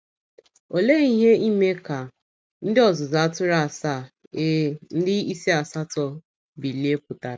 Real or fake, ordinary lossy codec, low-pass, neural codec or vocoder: real; none; none; none